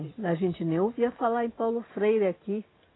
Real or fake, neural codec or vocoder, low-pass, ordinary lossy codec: real; none; 7.2 kHz; AAC, 16 kbps